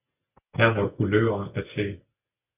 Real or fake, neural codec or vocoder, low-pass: real; none; 3.6 kHz